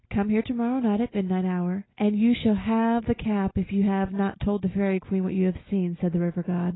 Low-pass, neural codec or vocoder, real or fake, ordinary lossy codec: 7.2 kHz; none; real; AAC, 16 kbps